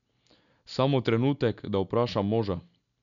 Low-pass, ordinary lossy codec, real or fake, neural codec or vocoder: 7.2 kHz; none; real; none